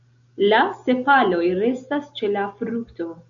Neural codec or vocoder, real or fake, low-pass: none; real; 7.2 kHz